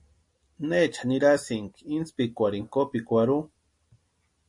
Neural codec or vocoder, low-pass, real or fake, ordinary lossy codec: none; 10.8 kHz; real; MP3, 48 kbps